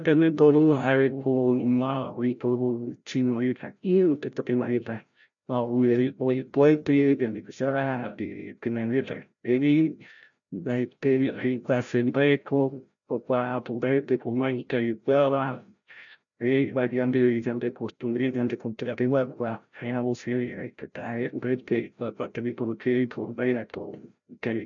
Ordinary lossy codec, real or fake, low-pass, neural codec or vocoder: none; fake; 7.2 kHz; codec, 16 kHz, 0.5 kbps, FreqCodec, larger model